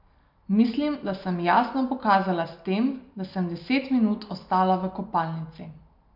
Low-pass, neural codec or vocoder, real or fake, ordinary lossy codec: 5.4 kHz; none; real; MP3, 48 kbps